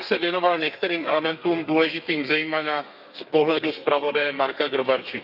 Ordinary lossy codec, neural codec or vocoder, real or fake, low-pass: none; codec, 32 kHz, 1.9 kbps, SNAC; fake; 5.4 kHz